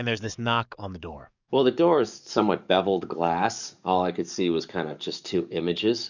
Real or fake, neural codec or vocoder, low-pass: fake; codec, 44.1 kHz, 7.8 kbps, DAC; 7.2 kHz